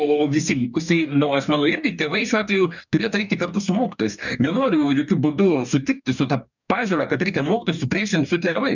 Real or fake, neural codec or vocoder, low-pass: fake; codec, 44.1 kHz, 2.6 kbps, DAC; 7.2 kHz